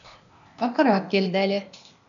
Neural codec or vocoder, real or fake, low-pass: codec, 16 kHz, 0.8 kbps, ZipCodec; fake; 7.2 kHz